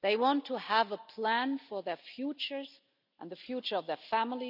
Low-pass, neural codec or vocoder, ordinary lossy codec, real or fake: 5.4 kHz; none; none; real